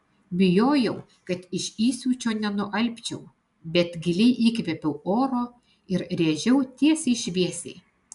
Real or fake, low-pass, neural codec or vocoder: real; 10.8 kHz; none